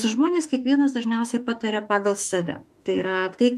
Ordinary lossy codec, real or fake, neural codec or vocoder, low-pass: AAC, 96 kbps; fake; autoencoder, 48 kHz, 32 numbers a frame, DAC-VAE, trained on Japanese speech; 14.4 kHz